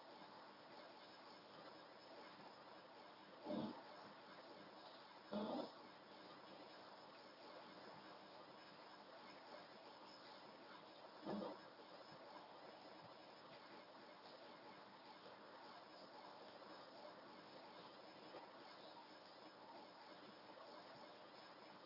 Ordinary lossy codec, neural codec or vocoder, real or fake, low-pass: none; codec, 24 kHz, 0.9 kbps, WavTokenizer, medium speech release version 1; fake; 5.4 kHz